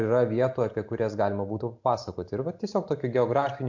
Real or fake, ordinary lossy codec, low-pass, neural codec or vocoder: real; MP3, 48 kbps; 7.2 kHz; none